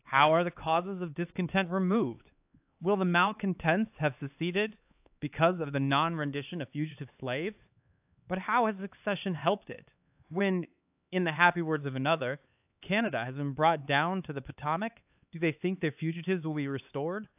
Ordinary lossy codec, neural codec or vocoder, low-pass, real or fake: AAC, 32 kbps; codec, 16 kHz, 4 kbps, X-Codec, HuBERT features, trained on LibriSpeech; 3.6 kHz; fake